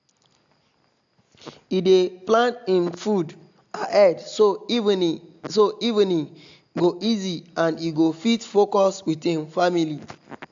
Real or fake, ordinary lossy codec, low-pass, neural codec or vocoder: real; AAC, 64 kbps; 7.2 kHz; none